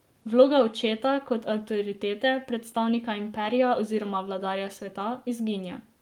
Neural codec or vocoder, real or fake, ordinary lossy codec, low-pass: codec, 44.1 kHz, 7.8 kbps, Pupu-Codec; fake; Opus, 32 kbps; 19.8 kHz